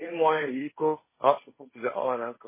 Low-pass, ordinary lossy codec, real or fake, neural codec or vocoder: 3.6 kHz; MP3, 16 kbps; fake; codec, 16 kHz, 1.1 kbps, Voila-Tokenizer